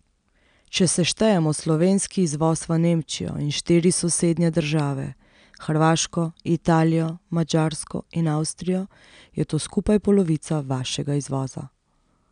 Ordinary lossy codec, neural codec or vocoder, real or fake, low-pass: none; none; real; 9.9 kHz